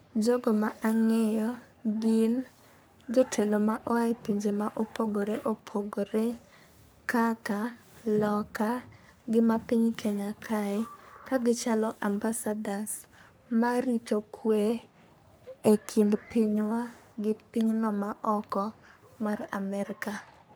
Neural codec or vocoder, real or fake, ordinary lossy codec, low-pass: codec, 44.1 kHz, 3.4 kbps, Pupu-Codec; fake; none; none